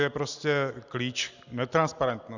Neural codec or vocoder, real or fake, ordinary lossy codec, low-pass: none; real; Opus, 64 kbps; 7.2 kHz